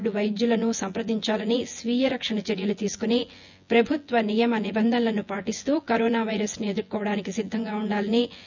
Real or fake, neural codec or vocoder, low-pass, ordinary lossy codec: fake; vocoder, 24 kHz, 100 mel bands, Vocos; 7.2 kHz; none